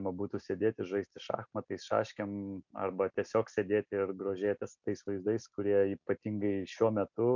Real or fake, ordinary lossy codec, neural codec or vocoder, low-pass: real; MP3, 64 kbps; none; 7.2 kHz